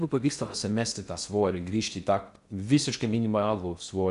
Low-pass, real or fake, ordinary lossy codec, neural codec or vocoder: 10.8 kHz; fake; MP3, 96 kbps; codec, 16 kHz in and 24 kHz out, 0.6 kbps, FocalCodec, streaming, 2048 codes